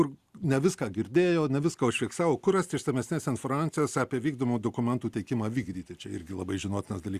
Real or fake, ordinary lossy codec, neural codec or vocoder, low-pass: real; Opus, 64 kbps; none; 14.4 kHz